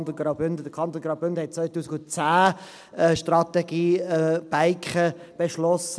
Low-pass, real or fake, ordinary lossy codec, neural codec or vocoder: none; real; none; none